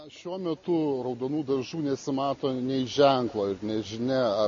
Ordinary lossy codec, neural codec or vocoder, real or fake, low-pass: MP3, 32 kbps; none; real; 7.2 kHz